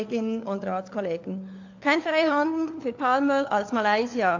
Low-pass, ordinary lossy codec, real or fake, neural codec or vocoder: 7.2 kHz; AAC, 48 kbps; fake; codec, 16 kHz, 4 kbps, FunCodec, trained on LibriTTS, 50 frames a second